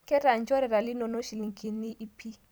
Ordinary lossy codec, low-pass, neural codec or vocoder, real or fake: none; none; vocoder, 44.1 kHz, 128 mel bands every 256 samples, BigVGAN v2; fake